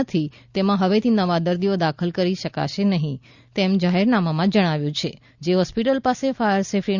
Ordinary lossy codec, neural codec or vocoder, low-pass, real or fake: Opus, 64 kbps; none; 7.2 kHz; real